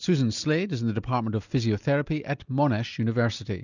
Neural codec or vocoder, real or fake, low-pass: none; real; 7.2 kHz